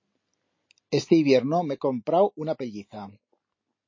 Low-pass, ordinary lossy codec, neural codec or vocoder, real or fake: 7.2 kHz; MP3, 32 kbps; none; real